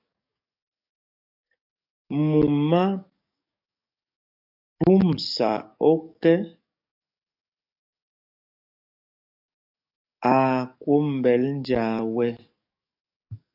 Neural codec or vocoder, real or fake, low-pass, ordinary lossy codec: codec, 44.1 kHz, 7.8 kbps, DAC; fake; 5.4 kHz; AAC, 48 kbps